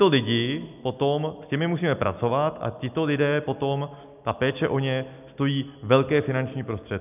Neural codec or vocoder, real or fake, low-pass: none; real; 3.6 kHz